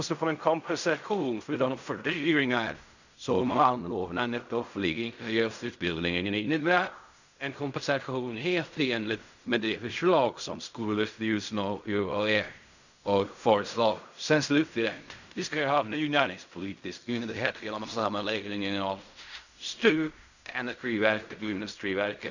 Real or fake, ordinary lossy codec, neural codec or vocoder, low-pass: fake; none; codec, 16 kHz in and 24 kHz out, 0.4 kbps, LongCat-Audio-Codec, fine tuned four codebook decoder; 7.2 kHz